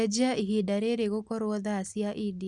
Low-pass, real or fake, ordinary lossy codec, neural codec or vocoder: 10.8 kHz; real; Opus, 64 kbps; none